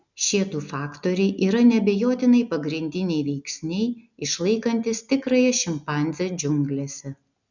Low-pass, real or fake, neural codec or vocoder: 7.2 kHz; real; none